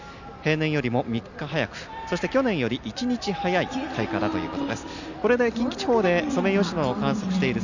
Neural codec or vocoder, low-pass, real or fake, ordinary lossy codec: none; 7.2 kHz; real; none